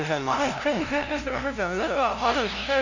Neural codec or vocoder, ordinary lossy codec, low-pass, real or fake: codec, 16 kHz, 0.5 kbps, FunCodec, trained on LibriTTS, 25 frames a second; none; 7.2 kHz; fake